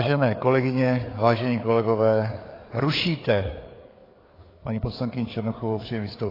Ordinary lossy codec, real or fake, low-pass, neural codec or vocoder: AAC, 24 kbps; fake; 5.4 kHz; codec, 16 kHz, 4 kbps, FreqCodec, larger model